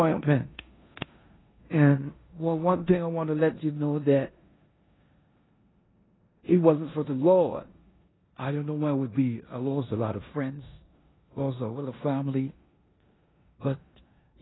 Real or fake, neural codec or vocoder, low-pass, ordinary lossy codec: fake; codec, 16 kHz in and 24 kHz out, 0.9 kbps, LongCat-Audio-Codec, four codebook decoder; 7.2 kHz; AAC, 16 kbps